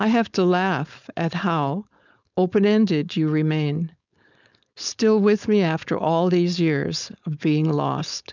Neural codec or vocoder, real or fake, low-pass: codec, 16 kHz, 4.8 kbps, FACodec; fake; 7.2 kHz